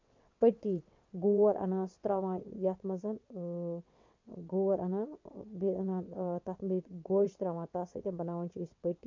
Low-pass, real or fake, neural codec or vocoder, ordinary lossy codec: 7.2 kHz; fake; vocoder, 22.05 kHz, 80 mel bands, WaveNeXt; MP3, 48 kbps